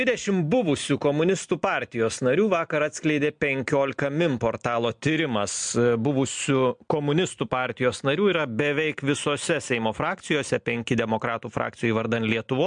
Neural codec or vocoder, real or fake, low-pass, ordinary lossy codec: none; real; 9.9 kHz; MP3, 64 kbps